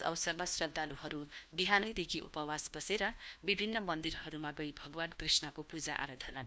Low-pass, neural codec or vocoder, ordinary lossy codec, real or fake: none; codec, 16 kHz, 1 kbps, FunCodec, trained on LibriTTS, 50 frames a second; none; fake